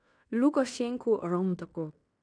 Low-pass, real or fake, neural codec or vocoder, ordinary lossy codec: 9.9 kHz; fake; codec, 16 kHz in and 24 kHz out, 0.9 kbps, LongCat-Audio-Codec, fine tuned four codebook decoder; none